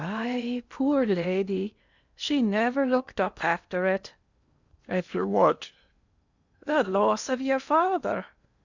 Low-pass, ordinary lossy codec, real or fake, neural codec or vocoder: 7.2 kHz; Opus, 64 kbps; fake; codec, 16 kHz in and 24 kHz out, 0.8 kbps, FocalCodec, streaming, 65536 codes